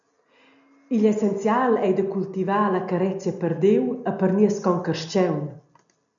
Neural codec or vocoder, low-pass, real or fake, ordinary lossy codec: none; 7.2 kHz; real; MP3, 96 kbps